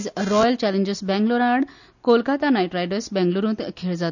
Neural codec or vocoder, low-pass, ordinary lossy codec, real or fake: none; 7.2 kHz; none; real